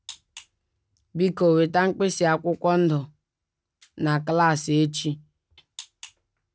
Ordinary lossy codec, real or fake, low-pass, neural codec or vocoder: none; real; none; none